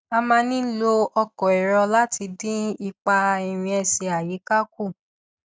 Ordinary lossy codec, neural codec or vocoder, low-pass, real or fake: none; none; none; real